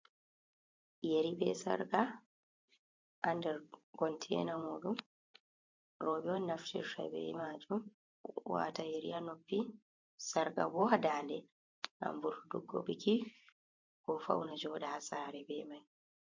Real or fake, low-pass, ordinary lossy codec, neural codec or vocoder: fake; 7.2 kHz; MP3, 48 kbps; vocoder, 44.1 kHz, 128 mel bands every 512 samples, BigVGAN v2